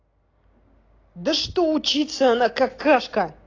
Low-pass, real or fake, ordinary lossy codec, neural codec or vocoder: 7.2 kHz; real; AAC, 32 kbps; none